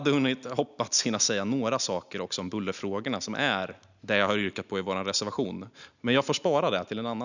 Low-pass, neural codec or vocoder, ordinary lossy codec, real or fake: 7.2 kHz; none; none; real